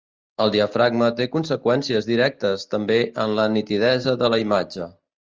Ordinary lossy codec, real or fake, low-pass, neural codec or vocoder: Opus, 24 kbps; real; 7.2 kHz; none